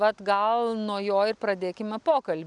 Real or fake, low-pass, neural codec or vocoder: real; 10.8 kHz; none